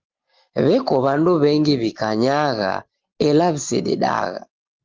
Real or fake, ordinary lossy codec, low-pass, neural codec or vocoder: real; Opus, 16 kbps; 7.2 kHz; none